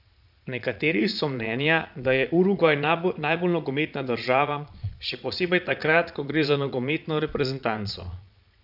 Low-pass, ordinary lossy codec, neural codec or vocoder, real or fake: 5.4 kHz; none; vocoder, 22.05 kHz, 80 mel bands, Vocos; fake